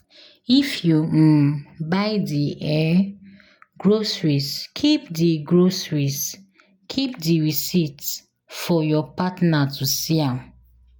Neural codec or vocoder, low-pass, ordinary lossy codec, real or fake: none; none; none; real